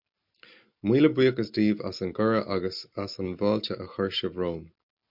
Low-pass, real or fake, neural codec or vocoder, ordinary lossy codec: 5.4 kHz; real; none; MP3, 48 kbps